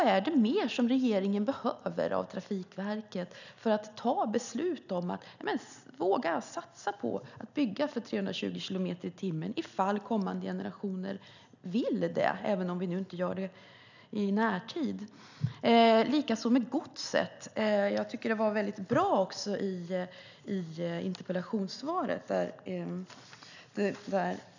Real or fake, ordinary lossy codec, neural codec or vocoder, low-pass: real; none; none; 7.2 kHz